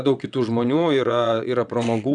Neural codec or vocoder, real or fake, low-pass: vocoder, 22.05 kHz, 80 mel bands, WaveNeXt; fake; 9.9 kHz